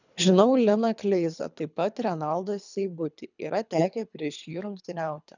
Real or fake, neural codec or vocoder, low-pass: fake; codec, 24 kHz, 3 kbps, HILCodec; 7.2 kHz